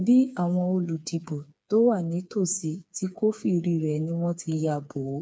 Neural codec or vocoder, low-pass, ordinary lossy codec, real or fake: codec, 16 kHz, 4 kbps, FreqCodec, smaller model; none; none; fake